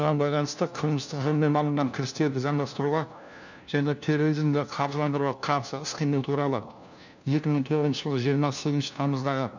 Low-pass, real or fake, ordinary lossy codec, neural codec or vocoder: 7.2 kHz; fake; none; codec, 16 kHz, 1 kbps, FunCodec, trained on LibriTTS, 50 frames a second